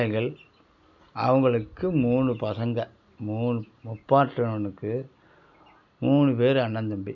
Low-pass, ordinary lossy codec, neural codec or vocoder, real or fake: 7.2 kHz; none; none; real